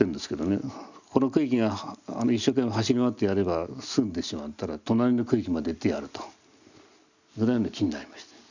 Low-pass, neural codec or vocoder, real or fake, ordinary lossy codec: 7.2 kHz; none; real; none